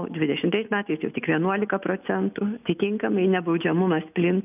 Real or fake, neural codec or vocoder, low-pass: real; none; 3.6 kHz